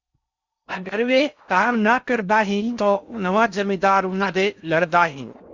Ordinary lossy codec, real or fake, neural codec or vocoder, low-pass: Opus, 64 kbps; fake; codec, 16 kHz in and 24 kHz out, 0.6 kbps, FocalCodec, streaming, 4096 codes; 7.2 kHz